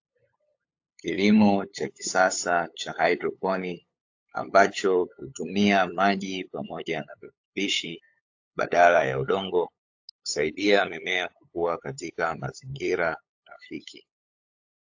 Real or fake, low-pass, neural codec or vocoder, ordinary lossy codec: fake; 7.2 kHz; codec, 16 kHz, 8 kbps, FunCodec, trained on LibriTTS, 25 frames a second; AAC, 48 kbps